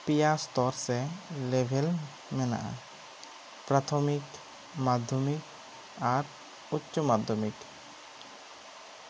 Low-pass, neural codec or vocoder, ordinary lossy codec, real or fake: none; none; none; real